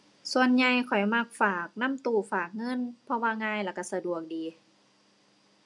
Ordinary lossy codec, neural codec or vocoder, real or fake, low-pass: none; none; real; 10.8 kHz